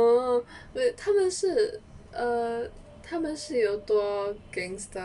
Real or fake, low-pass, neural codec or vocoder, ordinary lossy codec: real; 10.8 kHz; none; none